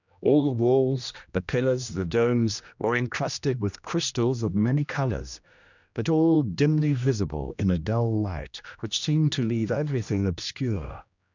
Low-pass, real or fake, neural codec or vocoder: 7.2 kHz; fake; codec, 16 kHz, 1 kbps, X-Codec, HuBERT features, trained on general audio